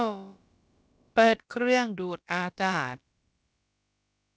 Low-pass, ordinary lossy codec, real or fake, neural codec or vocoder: none; none; fake; codec, 16 kHz, about 1 kbps, DyCAST, with the encoder's durations